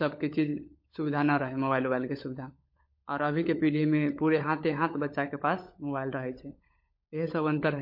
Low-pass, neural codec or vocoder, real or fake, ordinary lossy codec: 5.4 kHz; codec, 16 kHz, 16 kbps, FunCodec, trained on LibriTTS, 50 frames a second; fake; MP3, 32 kbps